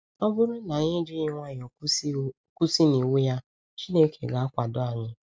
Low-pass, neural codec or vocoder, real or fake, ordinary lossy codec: none; none; real; none